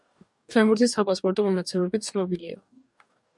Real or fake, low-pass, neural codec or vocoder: fake; 10.8 kHz; codec, 44.1 kHz, 2.6 kbps, DAC